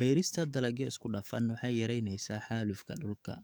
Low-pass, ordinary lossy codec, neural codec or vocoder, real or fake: none; none; codec, 44.1 kHz, 7.8 kbps, DAC; fake